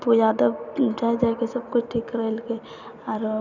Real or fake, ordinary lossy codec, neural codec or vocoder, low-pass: real; none; none; 7.2 kHz